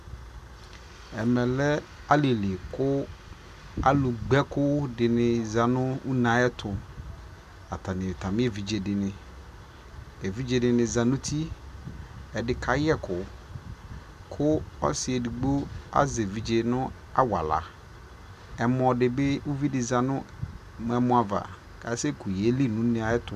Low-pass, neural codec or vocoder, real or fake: 14.4 kHz; none; real